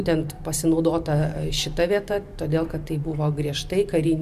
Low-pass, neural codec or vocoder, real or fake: 14.4 kHz; vocoder, 44.1 kHz, 128 mel bands every 512 samples, BigVGAN v2; fake